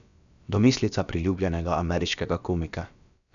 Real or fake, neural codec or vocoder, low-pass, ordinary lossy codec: fake; codec, 16 kHz, about 1 kbps, DyCAST, with the encoder's durations; 7.2 kHz; none